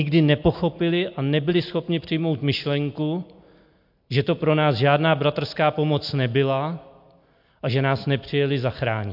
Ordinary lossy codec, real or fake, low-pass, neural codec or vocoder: MP3, 48 kbps; real; 5.4 kHz; none